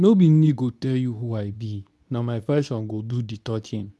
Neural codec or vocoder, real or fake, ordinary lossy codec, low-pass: codec, 24 kHz, 0.9 kbps, WavTokenizer, medium speech release version 2; fake; none; none